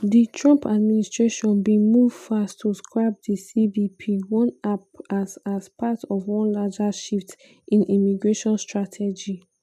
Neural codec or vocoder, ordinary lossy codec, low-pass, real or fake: none; none; 14.4 kHz; real